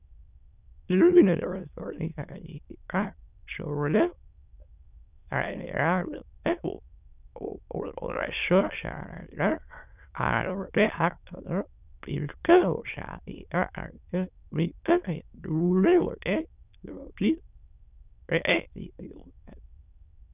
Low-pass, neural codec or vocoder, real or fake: 3.6 kHz; autoencoder, 22.05 kHz, a latent of 192 numbers a frame, VITS, trained on many speakers; fake